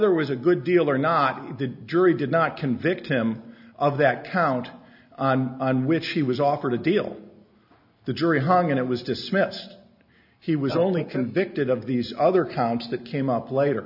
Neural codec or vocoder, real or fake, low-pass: none; real; 5.4 kHz